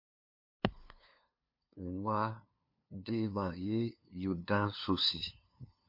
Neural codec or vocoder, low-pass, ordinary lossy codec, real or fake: codec, 16 kHz, 2 kbps, FunCodec, trained on LibriTTS, 25 frames a second; 5.4 kHz; MP3, 32 kbps; fake